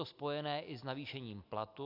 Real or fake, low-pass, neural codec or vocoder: real; 5.4 kHz; none